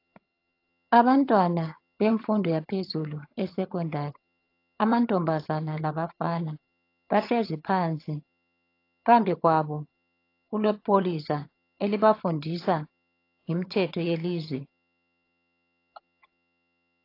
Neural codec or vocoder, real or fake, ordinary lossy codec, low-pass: vocoder, 22.05 kHz, 80 mel bands, HiFi-GAN; fake; AAC, 32 kbps; 5.4 kHz